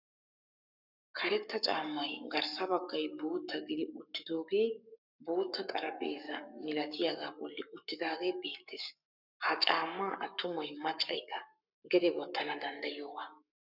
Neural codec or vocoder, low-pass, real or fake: codec, 44.1 kHz, 7.8 kbps, Pupu-Codec; 5.4 kHz; fake